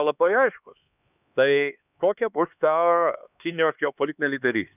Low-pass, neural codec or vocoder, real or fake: 3.6 kHz; codec, 16 kHz, 2 kbps, X-Codec, HuBERT features, trained on LibriSpeech; fake